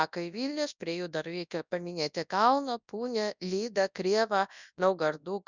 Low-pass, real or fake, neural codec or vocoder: 7.2 kHz; fake; codec, 24 kHz, 0.9 kbps, WavTokenizer, large speech release